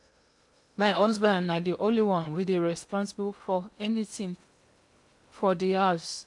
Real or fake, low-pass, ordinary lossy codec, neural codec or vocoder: fake; 10.8 kHz; MP3, 64 kbps; codec, 16 kHz in and 24 kHz out, 0.8 kbps, FocalCodec, streaming, 65536 codes